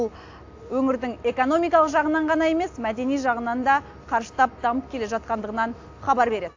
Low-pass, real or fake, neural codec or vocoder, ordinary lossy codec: 7.2 kHz; real; none; AAC, 48 kbps